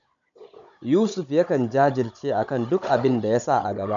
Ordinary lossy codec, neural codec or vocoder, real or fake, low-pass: none; codec, 16 kHz, 16 kbps, FunCodec, trained on Chinese and English, 50 frames a second; fake; 7.2 kHz